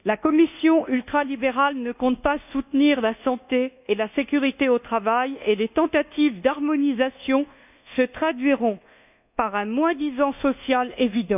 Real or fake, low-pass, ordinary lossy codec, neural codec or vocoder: fake; 3.6 kHz; none; codec, 24 kHz, 1.2 kbps, DualCodec